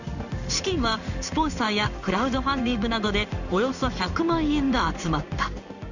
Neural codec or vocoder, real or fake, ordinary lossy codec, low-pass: codec, 16 kHz in and 24 kHz out, 1 kbps, XY-Tokenizer; fake; none; 7.2 kHz